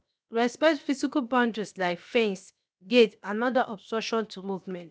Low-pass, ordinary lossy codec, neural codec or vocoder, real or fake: none; none; codec, 16 kHz, 0.7 kbps, FocalCodec; fake